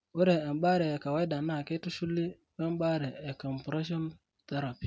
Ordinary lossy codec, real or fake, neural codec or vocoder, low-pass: none; real; none; none